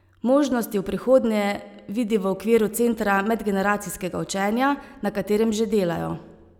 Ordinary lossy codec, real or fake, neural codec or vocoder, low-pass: none; real; none; 19.8 kHz